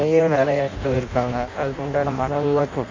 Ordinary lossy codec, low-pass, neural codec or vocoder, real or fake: MP3, 48 kbps; 7.2 kHz; codec, 16 kHz in and 24 kHz out, 0.6 kbps, FireRedTTS-2 codec; fake